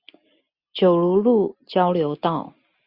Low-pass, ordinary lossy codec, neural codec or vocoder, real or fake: 5.4 kHz; Opus, 64 kbps; none; real